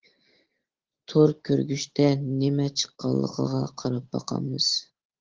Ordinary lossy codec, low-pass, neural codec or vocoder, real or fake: Opus, 16 kbps; 7.2 kHz; none; real